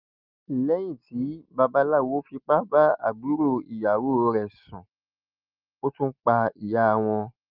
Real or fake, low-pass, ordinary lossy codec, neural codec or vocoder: real; 5.4 kHz; Opus, 24 kbps; none